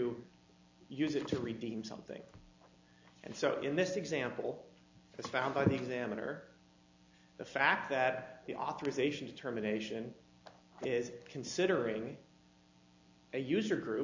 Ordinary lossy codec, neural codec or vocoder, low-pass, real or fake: AAC, 48 kbps; none; 7.2 kHz; real